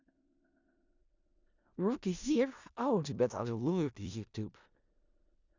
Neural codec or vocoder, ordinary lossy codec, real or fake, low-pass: codec, 16 kHz in and 24 kHz out, 0.4 kbps, LongCat-Audio-Codec, four codebook decoder; Opus, 64 kbps; fake; 7.2 kHz